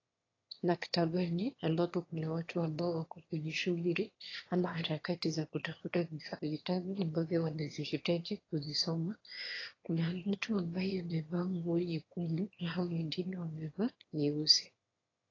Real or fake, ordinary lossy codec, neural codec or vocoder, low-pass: fake; AAC, 32 kbps; autoencoder, 22.05 kHz, a latent of 192 numbers a frame, VITS, trained on one speaker; 7.2 kHz